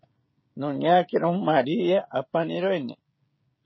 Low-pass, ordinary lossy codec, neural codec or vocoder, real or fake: 7.2 kHz; MP3, 24 kbps; codec, 16 kHz, 16 kbps, FreqCodec, smaller model; fake